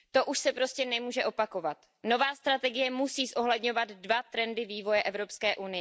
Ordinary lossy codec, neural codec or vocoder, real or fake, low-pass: none; none; real; none